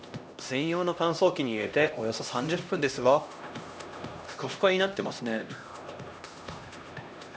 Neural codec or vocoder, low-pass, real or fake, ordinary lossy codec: codec, 16 kHz, 1 kbps, X-Codec, HuBERT features, trained on LibriSpeech; none; fake; none